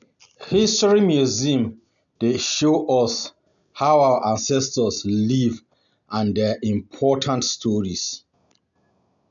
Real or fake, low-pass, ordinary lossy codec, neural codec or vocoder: real; 7.2 kHz; none; none